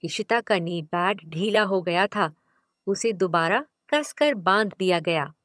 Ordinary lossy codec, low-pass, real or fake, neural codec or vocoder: none; none; fake; vocoder, 22.05 kHz, 80 mel bands, HiFi-GAN